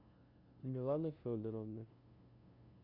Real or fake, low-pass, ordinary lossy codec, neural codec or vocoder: fake; 5.4 kHz; Opus, 64 kbps; codec, 16 kHz, 0.5 kbps, FunCodec, trained on LibriTTS, 25 frames a second